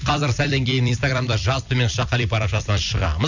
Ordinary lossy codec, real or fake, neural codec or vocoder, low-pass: none; fake; vocoder, 44.1 kHz, 128 mel bands every 256 samples, BigVGAN v2; 7.2 kHz